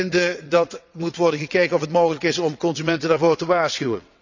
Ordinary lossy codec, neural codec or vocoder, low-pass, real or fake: none; vocoder, 22.05 kHz, 80 mel bands, WaveNeXt; 7.2 kHz; fake